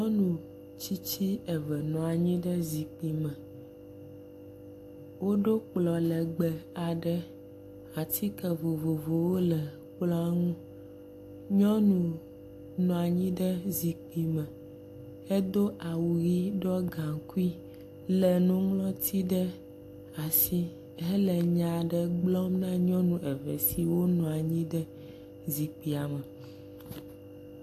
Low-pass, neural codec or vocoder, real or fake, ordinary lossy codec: 14.4 kHz; none; real; AAC, 64 kbps